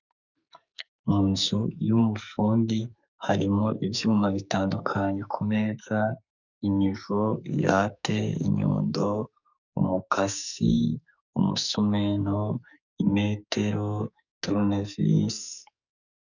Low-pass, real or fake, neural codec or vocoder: 7.2 kHz; fake; codec, 44.1 kHz, 2.6 kbps, SNAC